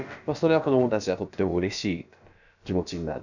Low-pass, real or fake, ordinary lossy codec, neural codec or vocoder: 7.2 kHz; fake; none; codec, 16 kHz, about 1 kbps, DyCAST, with the encoder's durations